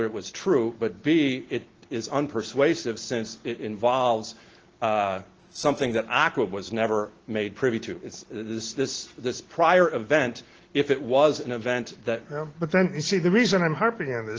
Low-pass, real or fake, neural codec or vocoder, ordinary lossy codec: 7.2 kHz; real; none; Opus, 16 kbps